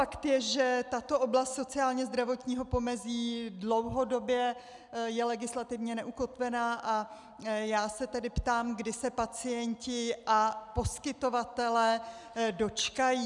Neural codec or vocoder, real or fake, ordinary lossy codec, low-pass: none; real; MP3, 96 kbps; 10.8 kHz